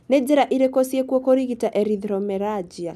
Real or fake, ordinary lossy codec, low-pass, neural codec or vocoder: real; none; 14.4 kHz; none